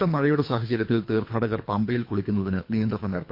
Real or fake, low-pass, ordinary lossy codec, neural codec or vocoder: fake; 5.4 kHz; MP3, 32 kbps; codec, 24 kHz, 3 kbps, HILCodec